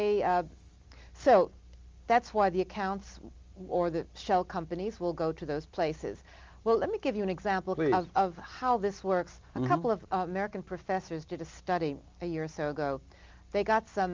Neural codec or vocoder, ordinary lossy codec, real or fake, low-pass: none; Opus, 32 kbps; real; 7.2 kHz